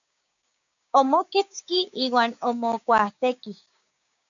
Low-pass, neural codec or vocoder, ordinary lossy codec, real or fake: 7.2 kHz; codec, 16 kHz, 6 kbps, DAC; MP3, 96 kbps; fake